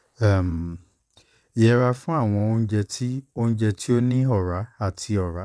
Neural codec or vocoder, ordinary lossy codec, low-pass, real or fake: vocoder, 22.05 kHz, 80 mel bands, WaveNeXt; none; none; fake